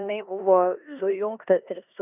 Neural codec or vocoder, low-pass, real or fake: codec, 16 kHz in and 24 kHz out, 0.9 kbps, LongCat-Audio-Codec, four codebook decoder; 3.6 kHz; fake